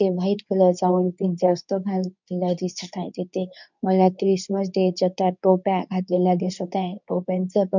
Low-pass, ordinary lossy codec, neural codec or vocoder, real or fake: 7.2 kHz; none; codec, 24 kHz, 0.9 kbps, WavTokenizer, medium speech release version 2; fake